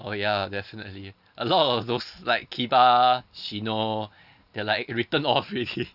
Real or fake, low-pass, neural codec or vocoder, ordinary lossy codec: real; 5.4 kHz; none; none